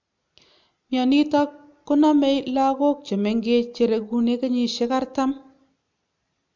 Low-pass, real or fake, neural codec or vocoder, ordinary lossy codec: 7.2 kHz; real; none; MP3, 64 kbps